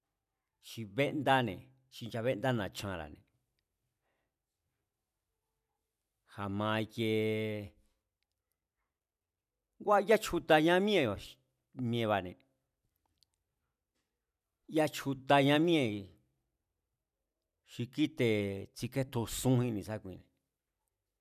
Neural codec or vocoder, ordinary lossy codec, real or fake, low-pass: vocoder, 44.1 kHz, 128 mel bands every 256 samples, BigVGAN v2; none; fake; 14.4 kHz